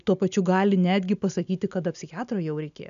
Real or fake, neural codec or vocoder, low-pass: real; none; 7.2 kHz